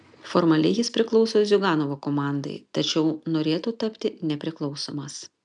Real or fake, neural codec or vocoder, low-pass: real; none; 9.9 kHz